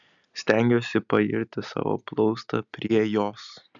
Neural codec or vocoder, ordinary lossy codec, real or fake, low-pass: none; MP3, 96 kbps; real; 7.2 kHz